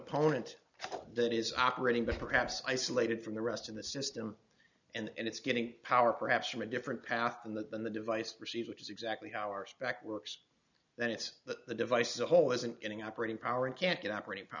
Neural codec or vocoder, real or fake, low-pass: none; real; 7.2 kHz